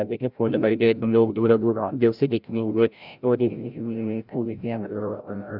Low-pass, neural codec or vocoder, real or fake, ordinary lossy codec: 5.4 kHz; codec, 16 kHz, 0.5 kbps, FreqCodec, larger model; fake; Opus, 64 kbps